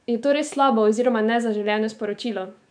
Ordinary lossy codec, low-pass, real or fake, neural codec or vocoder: none; 9.9 kHz; fake; autoencoder, 48 kHz, 128 numbers a frame, DAC-VAE, trained on Japanese speech